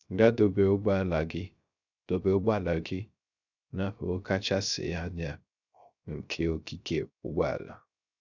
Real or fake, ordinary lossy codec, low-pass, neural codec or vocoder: fake; none; 7.2 kHz; codec, 16 kHz, 0.3 kbps, FocalCodec